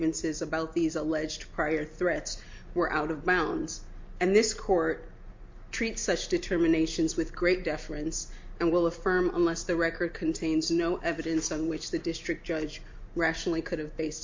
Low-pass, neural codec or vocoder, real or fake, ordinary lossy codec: 7.2 kHz; none; real; MP3, 48 kbps